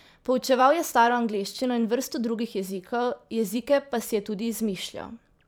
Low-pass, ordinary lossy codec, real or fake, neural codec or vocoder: none; none; fake; vocoder, 44.1 kHz, 128 mel bands every 512 samples, BigVGAN v2